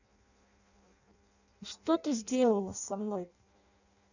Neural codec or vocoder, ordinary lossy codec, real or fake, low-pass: codec, 16 kHz in and 24 kHz out, 0.6 kbps, FireRedTTS-2 codec; none; fake; 7.2 kHz